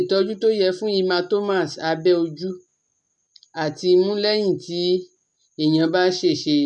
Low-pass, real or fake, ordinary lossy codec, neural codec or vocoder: 10.8 kHz; real; none; none